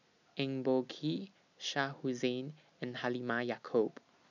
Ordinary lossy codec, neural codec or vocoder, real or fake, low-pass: none; none; real; 7.2 kHz